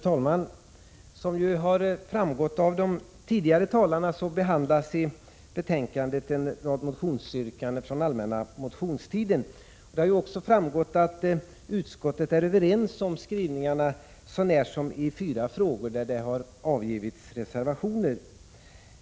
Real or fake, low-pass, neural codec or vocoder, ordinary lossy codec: real; none; none; none